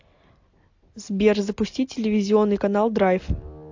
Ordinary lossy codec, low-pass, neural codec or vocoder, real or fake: AAC, 48 kbps; 7.2 kHz; none; real